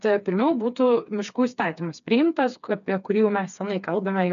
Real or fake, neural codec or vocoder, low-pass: fake; codec, 16 kHz, 4 kbps, FreqCodec, smaller model; 7.2 kHz